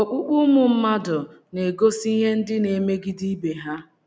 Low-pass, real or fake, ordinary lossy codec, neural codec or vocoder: none; real; none; none